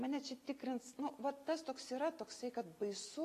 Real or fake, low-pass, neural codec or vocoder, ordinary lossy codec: real; 14.4 kHz; none; AAC, 48 kbps